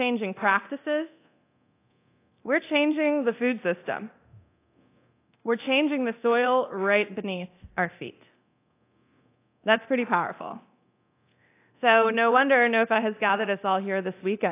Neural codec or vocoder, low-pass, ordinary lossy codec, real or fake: codec, 24 kHz, 0.9 kbps, DualCodec; 3.6 kHz; AAC, 24 kbps; fake